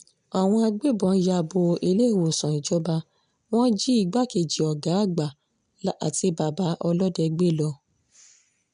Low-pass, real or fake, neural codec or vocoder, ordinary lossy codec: 9.9 kHz; real; none; none